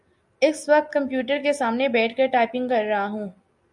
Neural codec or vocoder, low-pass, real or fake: none; 10.8 kHz; real